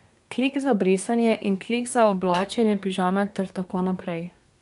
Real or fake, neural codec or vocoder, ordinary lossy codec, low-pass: fake; codec, 24 kHz, 1 kbps, SNAC; none; 10.8 kHz